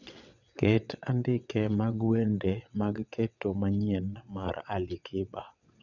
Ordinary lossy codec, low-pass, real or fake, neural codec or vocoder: none; 7.2 kHz; fake; vocoder, 22.05 kHz, 80 mel bands, WaveNeXt